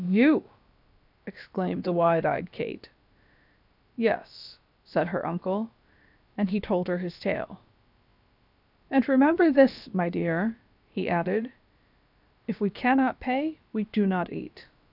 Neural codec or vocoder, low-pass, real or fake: codec, 16 kHz, about 1 kbps, DyCAST, with the encoder's durations; 5.4 kHz; fake